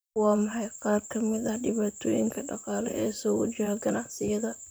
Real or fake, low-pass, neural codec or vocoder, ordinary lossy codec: fake; none; vocoder, 44.1 kHz, 128 mel bands, Pupu-Vocoder; none